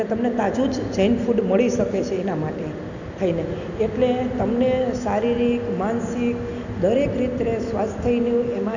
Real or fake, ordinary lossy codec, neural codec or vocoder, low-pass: real; none; none; 7.2 kHz